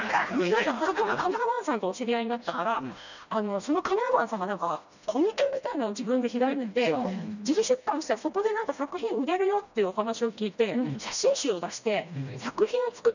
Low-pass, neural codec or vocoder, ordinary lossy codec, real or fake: 7.2 kHz; codec, 16 kHz, 1 kbps, FreqCodec, smaller model; none; fake